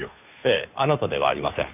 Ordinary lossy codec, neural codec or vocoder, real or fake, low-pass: none; codec, 16 kHz, 2 kbps, FunCodec, trained on Chinese and English, 25 frames a second; fake; 3.6 kHz